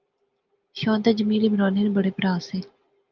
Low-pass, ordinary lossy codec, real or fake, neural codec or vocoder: 7.2 kHz; Opus, 24 kbps; real; none